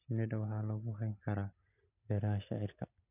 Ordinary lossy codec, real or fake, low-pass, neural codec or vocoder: none; real; 3.6 kHz; none